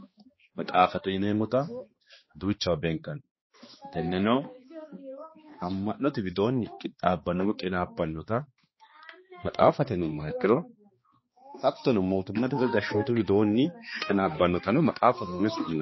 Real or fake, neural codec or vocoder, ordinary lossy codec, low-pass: fake; codec, 16 kHz, 2 kbps, X-Codec, HuBERT features, trained on balanced general audio; MP3, 24 kbps; 7.2 kHz